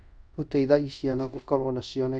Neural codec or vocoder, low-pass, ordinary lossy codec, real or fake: codec, 24 kHz, 0.5 kbps, DualCodec; 9.9 kHz; none; fake